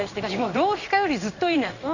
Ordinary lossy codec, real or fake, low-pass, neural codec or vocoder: none; fake; 7.2 kHz; codec, 16 kHz in and 24 kHz out, 1 kbps, XY-Tokenizer